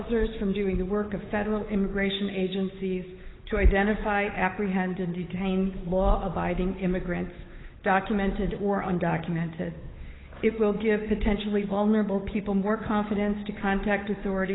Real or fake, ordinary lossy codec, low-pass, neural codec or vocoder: fake; AAC, 16 kbps; 7.2 kHz; codec, 16 kHz, 8 kbps, FunCodec, trained on Chinese and English, 25 frames a second